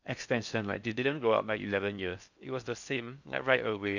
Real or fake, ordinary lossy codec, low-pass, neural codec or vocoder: fake; none; 7.2 kHz; codec, 16 kHz in and 24 kHz out, 0.6 kbps, FocalCodec, streaming, 4096 codes